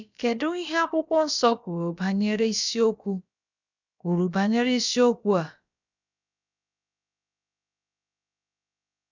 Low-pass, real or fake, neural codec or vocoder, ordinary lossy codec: 7.2 kHz; fake; codec, 16 kHz, about 1 kbps, DyCAST, with the encoder's durations; none